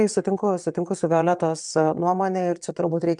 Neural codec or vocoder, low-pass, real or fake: vocoder, 22.05 kHz, 80 mel bands, WaveNeXt; 9.9 kHz; fake